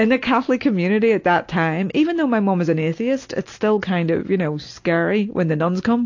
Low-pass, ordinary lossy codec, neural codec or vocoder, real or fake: 7.2 kHz; AAC, 48 kbps; none; real